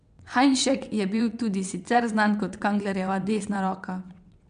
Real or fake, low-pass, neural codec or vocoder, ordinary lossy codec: fake; 9.9 kHz; vocoder, 22.05 kHz, 80 mel bands, WaveNeXt; MP3, 96 kbps